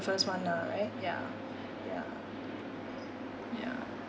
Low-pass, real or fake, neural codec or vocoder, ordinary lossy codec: none; real; none; none